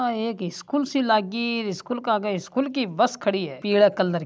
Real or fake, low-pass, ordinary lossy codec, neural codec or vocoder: real; none; none; none